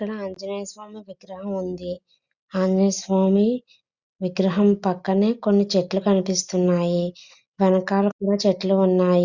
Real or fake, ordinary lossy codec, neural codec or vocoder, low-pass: real; Opus, 64 kbps; none; 7.2 kHz